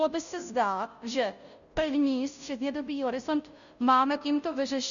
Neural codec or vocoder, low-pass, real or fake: codec, 16 kHz, 0.5 kbps, FunCodec, trained on Chinese and English, 25 frames a second; 7.2 kHz; fake